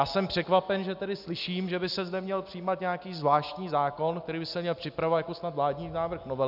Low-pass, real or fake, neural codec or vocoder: 5.4 kHz; real; none